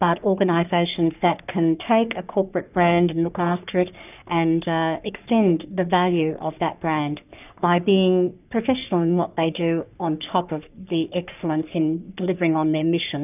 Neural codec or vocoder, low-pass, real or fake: codec, 44.1 kHz, 3.4 kbps, Pupu-Codec; 3.6 kHz; fake